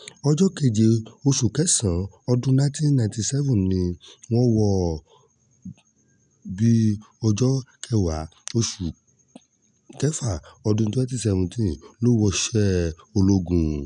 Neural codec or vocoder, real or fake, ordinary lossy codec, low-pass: none; real; none; 9.9 kHz